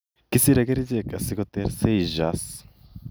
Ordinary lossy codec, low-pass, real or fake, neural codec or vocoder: none; none; real; none